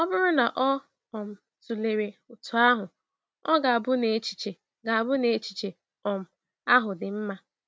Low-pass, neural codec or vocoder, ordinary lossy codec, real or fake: none; none; none; real